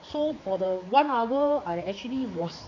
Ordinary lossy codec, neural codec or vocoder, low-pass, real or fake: MP3, 48 kbps; codec, 16 kHz, 4 kbps, X-Codec, HuBERT features, trained on general audio; 7.2 kHz; fake